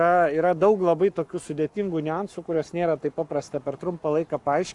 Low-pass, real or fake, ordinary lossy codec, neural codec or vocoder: 10.8 kHz; fake; AAC, 64 kbps; codec, 44.1 kHz, 7.8 kbps, Pupu-Codec